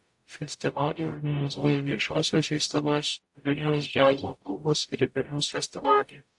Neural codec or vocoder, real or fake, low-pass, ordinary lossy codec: codec, 44.1 kHz, 0.9 kbps, DAC; fake; 10.8 kHz; AAC, 64 kbps